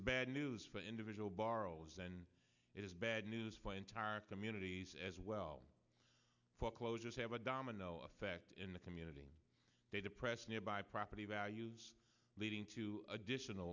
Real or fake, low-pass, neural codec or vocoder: real; 7.2 kHz; none